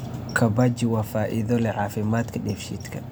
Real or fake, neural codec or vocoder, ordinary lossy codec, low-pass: real; none; none; none